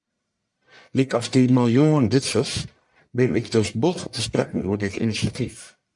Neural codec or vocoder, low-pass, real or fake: codec, 44.1 kHz, 1.7 kbps, Pupu-Codec; 10.8 kHz; fake